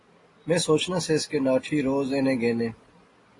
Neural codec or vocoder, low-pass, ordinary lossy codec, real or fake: none; 10.8 kHz; AAC, 32 kbps; real